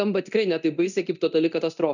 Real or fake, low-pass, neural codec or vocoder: fake; 7.2 kHz; codec, 24 kHz, 0.9 kbps, DualCodec